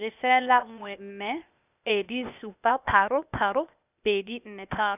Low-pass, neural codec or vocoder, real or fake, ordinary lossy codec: 3.6 kHz; codec, 16 kHz, 0.8 kbps, ZipCodec; fake; none